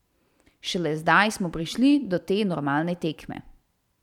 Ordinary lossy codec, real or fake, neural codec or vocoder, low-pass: none; fake; vocoder, 44.1 kHz, 128 mel bands every 512 samples, BigVGAN v2; 19.8 kHz